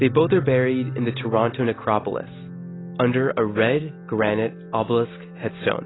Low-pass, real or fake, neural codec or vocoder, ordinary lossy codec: 7.2 kHz; real; none; AAC, 16 kbps